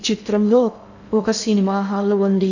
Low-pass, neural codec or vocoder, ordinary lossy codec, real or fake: 7.2 kHz; codec, 16 kHz in and 24 kHz out, 0.6 kbps, FocalCodec, streaming, 2048 codes; none; fake